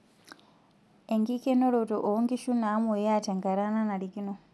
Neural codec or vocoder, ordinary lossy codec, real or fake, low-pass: none; none; real; none